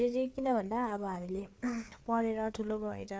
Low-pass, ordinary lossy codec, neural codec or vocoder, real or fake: none; none; codec, 16 kHz, 4 kbps, FreqCodec, larger model; fake